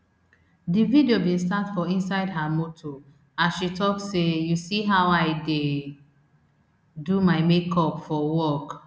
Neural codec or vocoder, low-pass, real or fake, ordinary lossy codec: none; none; real; none